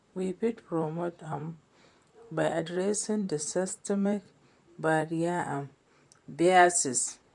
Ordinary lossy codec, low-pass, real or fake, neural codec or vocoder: MP3, 64 kbps; 10.8 kHz; fake; vocoder, 44.1 kHz, 128 mel bands, Pupu-Vocoder